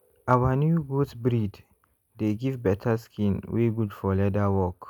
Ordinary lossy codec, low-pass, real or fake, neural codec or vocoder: none; 19.8 kHz; real; none